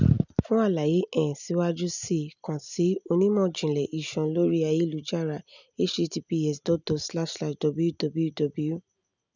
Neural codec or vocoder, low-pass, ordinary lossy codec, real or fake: none; 7.2 kHz; none; real